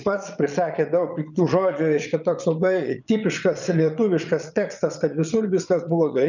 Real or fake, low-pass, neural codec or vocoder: fake; 7.2 kHz; vocoder, 22.05 kHz, 80 mel bands, Vocos